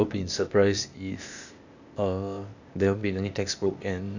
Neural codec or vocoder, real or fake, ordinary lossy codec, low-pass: codec, 16 kHz, 0.8 kbps, ZipCodec; fake; none; 7.2 kHz